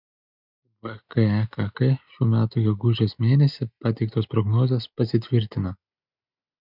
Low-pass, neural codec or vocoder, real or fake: 5.4 kHz; none; real